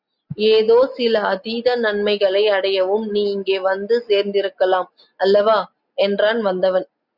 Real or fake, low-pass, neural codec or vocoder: real; 5.4 kHz; none